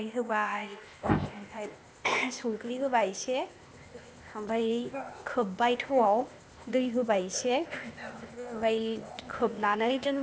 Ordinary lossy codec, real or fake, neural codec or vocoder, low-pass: none; fake; codec, 16 kHz, 0.8 kbps, ZipCodec; none